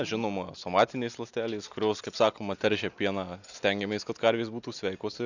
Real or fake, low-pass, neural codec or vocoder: real; 7.2 kHz; none